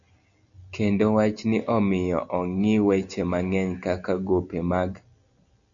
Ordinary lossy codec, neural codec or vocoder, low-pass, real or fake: MP3, 96 kbps; none; 7.2 kHz; real